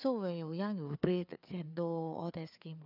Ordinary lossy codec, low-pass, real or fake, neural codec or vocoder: none; 5.4 kHz; fake; codec, 16 kHz, 4 kbps, FreqCodec, larger model